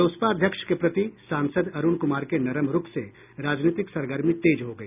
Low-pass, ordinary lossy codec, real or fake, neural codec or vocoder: 3.6 kHz; none; real; none